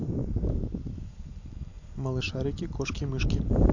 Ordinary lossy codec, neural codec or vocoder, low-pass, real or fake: AAC, 48 kbps; vocoder, 44.1 kHz, 128 mel bands every 512 samples, BigVGAN v2; 7.2 kHz; fake